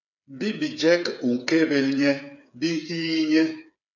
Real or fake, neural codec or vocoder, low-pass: fake; codec, 16 kHz, 8 kbps, FreqCodec, smaller model; 7.2 kHz